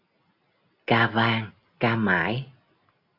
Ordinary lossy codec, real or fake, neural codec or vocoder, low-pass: AAC, 48 kbps; real; none; 5.4 kHz